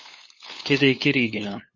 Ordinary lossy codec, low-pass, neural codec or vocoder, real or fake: MP3, 32 kbps; 7.2 kHz; codec, 16 kHz, 8 kbps, FunCodec, trained on LibriTTS, 25 frames a second; fake